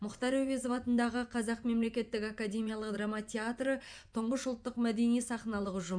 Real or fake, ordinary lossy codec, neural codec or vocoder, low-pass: fake; none; vocoder, 24 kHz, 100 mel bands, Vocos; 9.9 kHz